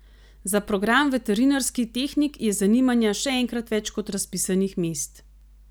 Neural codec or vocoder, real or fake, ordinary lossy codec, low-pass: none; real; none; none